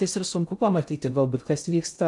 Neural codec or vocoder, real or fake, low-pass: codec, 16 kHz in and 24 kHz out, 0.6 kbps, FocalCodec, streaming, 2048 codes; fake; 10.8 kHz